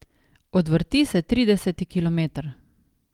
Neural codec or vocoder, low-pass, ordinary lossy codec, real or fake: none; 19.8 kHz; Opus, 24 kbps; real